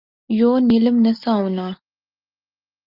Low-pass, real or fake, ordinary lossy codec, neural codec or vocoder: 5.4 kHz; real; Opus, 32 kbps; none